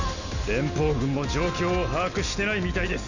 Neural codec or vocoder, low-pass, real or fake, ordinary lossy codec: none; 7.2 kHz; real; none